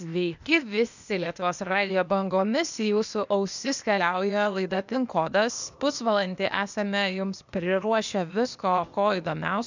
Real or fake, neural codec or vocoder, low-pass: fake; codec, 16 kHz, 0.8 kbps, ZipCodec; 7.2 kHz